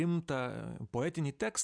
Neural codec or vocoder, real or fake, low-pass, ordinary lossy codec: none; real; 9.9 kHz; MP3, 96 kbps